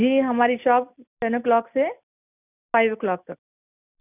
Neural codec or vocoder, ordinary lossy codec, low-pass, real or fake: none; none; 3.6 kHz; real